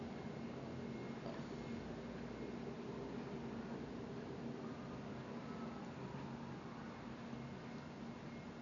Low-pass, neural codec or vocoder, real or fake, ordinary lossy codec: 7.2 kHz; none; real; none